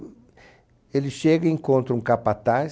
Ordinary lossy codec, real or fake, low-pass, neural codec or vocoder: none; real; none; none